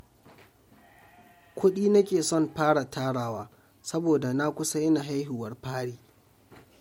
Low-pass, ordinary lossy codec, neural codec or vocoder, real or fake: 19.8 kHz; MP3, 64 kbps; none; real